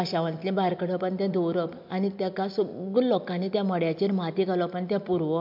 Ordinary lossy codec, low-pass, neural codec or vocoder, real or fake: MP3, 48 kbps; 5.4 kHz; none; real